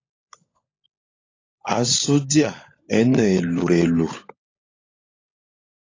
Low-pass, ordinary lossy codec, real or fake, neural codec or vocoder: 7.2 kHz; AAC, 32 kbps; fake; codec, 16 kHz, 16 kbps, FunCodec, trained on LibriTTS, 50 frames a second